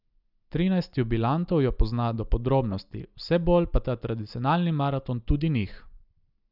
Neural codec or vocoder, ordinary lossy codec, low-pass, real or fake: none; none; 5.4 kHz; real